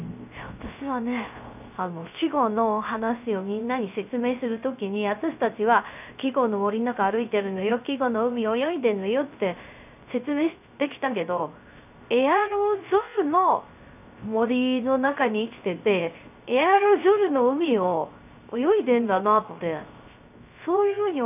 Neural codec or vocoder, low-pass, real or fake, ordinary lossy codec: codec, 16 kHz, 0.3 kbps, FocalCodec; 3.6 kHz; fake; none